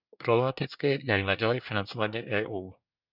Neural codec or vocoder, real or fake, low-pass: codec, 24 kHz, 1 kbps, SNAC; fake; 5.4 kHz